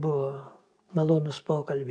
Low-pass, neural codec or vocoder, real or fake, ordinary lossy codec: 9.9 kHz; codec, 44.1 kHz, 7.8 kbps, DAC; fake; AAC, 64 kbps